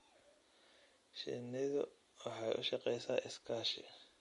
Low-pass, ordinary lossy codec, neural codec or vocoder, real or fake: 14.4 kHz; MP3, 48 kbps; none; real